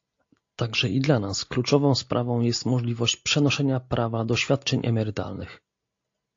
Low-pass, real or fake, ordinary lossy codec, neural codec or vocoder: 7.2 kHz; real; AAC, 48 kbps; none